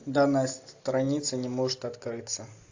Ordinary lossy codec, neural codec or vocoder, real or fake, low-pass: AAC, 48 kbps; none; real; 7.2 kHz